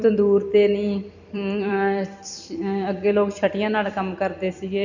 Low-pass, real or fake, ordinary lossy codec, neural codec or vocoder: 7.2 kHz; fake; none; vocoder, 44.1 kHz, 128 mel bands every 256 samples, BigVGAN v2